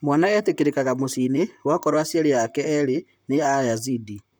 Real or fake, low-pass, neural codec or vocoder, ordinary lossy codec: fake; none; vocoder, 44.1 kHz, 128 mel bands, Pupu-Vocoder; none